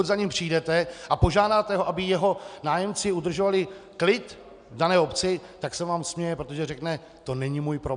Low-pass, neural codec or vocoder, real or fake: 9.9 kHz; none; real